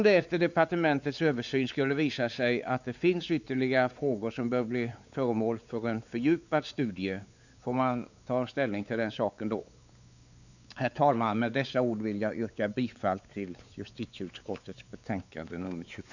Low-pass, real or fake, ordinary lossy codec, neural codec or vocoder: 7.2 kHz; fake; none; codec, 16 kHz, 4 kbps, X-Codec, WavLM features, trained on Multilingual LibriSpeech